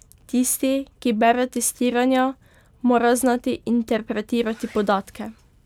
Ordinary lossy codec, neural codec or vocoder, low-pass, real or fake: none; none; 19.8 kHz; real